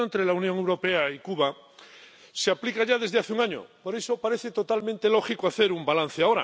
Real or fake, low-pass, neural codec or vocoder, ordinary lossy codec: real; none; none; none